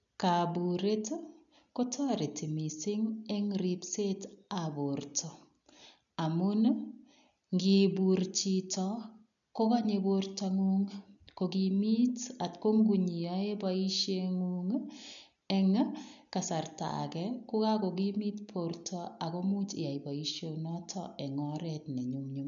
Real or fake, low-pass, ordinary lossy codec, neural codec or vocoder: real; 7.2 kHz; none; none